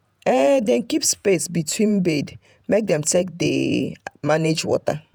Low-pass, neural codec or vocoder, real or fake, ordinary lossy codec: none; vocoder, 48 kHz, 128 mel bands, Vocos; fake; none